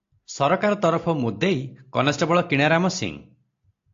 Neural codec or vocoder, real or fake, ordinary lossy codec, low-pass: none; real; AAC, 48 kbps; 7.2 kHz